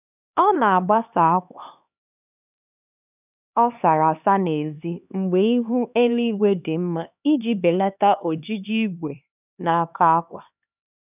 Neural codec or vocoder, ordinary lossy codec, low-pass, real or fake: codec, 16 kHz, 2 kbps, X-Codec, HuBERT features, trained on LibriSpeech; none; 3.6 kHz; fake